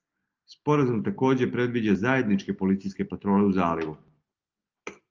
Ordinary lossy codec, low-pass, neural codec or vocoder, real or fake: Opus, 24 kbps; 7.2 kHz; none; real